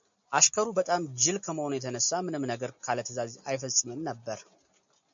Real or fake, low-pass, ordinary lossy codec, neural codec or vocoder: real; 7.2 kHz; MP3, 64 kbps; none